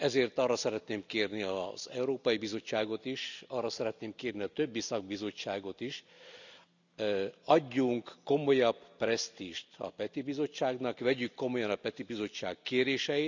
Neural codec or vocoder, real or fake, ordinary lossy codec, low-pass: none; real; none; 7.2 kHz